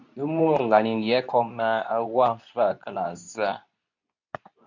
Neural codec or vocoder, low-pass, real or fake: codec, 24 kHz, 0.9 kbps, WavTokenizer, medium speech release version 2; 7.2 kHz; fake